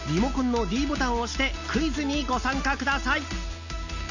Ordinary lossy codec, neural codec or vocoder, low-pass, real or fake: none; none; 7.2 kHz; real